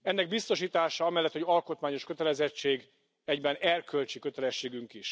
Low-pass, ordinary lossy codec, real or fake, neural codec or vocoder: none; none; real; none